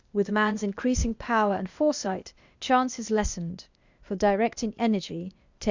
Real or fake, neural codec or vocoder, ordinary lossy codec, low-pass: fake; codec, 16 kHz, 0.8 kbps, ZipCodec; Opus, 64 kbps; 7.2 kHz